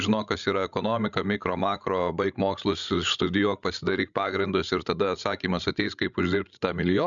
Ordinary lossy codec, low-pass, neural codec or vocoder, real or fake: MP3, 96 kbps; 7.2 kHz; codec, 16 kHz, 16 kbps, FreqCodec, larger model; fake